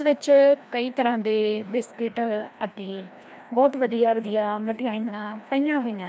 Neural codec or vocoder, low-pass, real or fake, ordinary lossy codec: codec, 16 kHz, 1 kbps, FreqCodec, larger model; none; fake; none